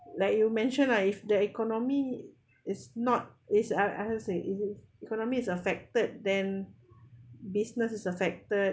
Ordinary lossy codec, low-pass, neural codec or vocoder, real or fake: none; none; none; real